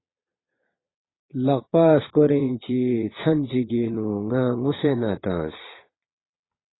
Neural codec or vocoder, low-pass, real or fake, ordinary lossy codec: vocoder, 22.05 kHz, 80 mel bands, Vocos; 7.2 kHz; fake; AAC, 16 kbps